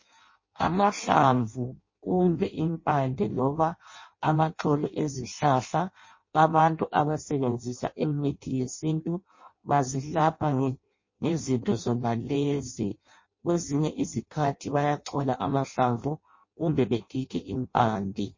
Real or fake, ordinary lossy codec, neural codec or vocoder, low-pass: fake; MP3, 32 kbps; codec, 16 kHz in and 24 kHz out, 0.6 kbps, FireRedTTS-2 codec; 7.2 kHz